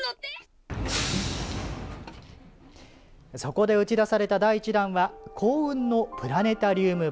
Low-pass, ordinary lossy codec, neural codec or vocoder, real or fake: none; none; none; real